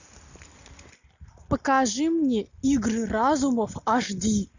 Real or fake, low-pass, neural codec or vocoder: real; 7.2 kHz; none